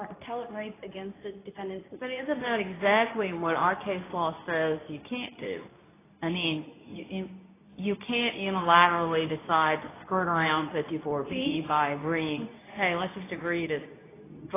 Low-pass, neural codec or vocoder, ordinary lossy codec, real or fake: 3.6 kHz; codec, 24 kHz, 0.9 kbps, WavTokenizer, medium speech release version 2; AAC, 16 kbps; fake